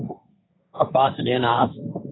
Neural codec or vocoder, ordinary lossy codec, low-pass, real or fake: codec, 16 kHz, 1.1 kbps, Voila-Tokenizer; AAC, 16 kbps; 7.2 kHz; fake